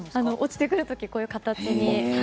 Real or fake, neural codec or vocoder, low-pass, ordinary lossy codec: real; none; none; none